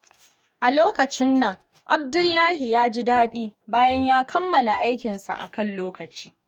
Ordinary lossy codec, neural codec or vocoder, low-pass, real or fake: none; codec, 44.1 kHz, 2.6 kbps, DAC; 19.8 kHz; fake